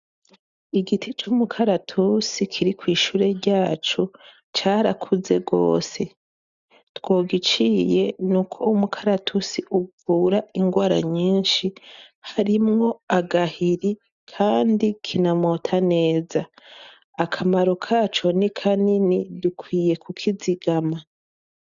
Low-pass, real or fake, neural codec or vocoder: 7.2 kHz; real; none